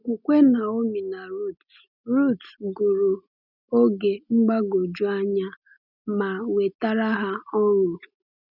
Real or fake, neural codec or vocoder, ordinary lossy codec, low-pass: real; none; none; 5.4 kHz